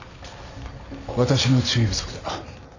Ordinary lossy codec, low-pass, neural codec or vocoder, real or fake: none; 7.2 kHz; none; real